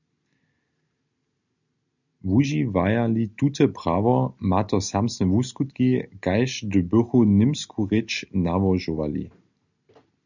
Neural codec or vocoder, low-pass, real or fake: none; 7.2 kHz; real